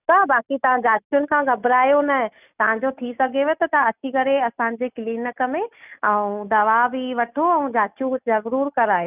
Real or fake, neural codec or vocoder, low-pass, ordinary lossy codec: real; none; 3.6 kHz; none